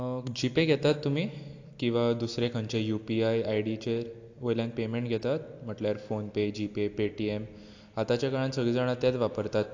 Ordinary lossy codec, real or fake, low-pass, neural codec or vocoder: AAC, 48 kbps; real; 7.2 kHz; none